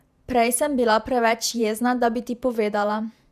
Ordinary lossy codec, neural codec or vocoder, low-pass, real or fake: none; vocoder, 48 kHz, 128 mel bands, Vocos; 14.4 kHz; fake